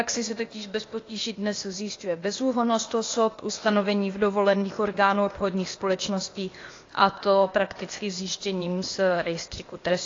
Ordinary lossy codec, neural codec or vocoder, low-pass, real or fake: AAC, 32 kbps; codec, 16 kHz, 0.8 kbps, ZipCodec; 7.2 kHz; fake